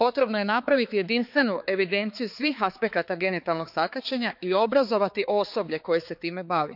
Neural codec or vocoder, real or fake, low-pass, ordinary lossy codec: codec, 16 kHz, 4 kbps, X-Codec, HuBERT features, trained on balanced general audio; fake; 5.4 kHz; none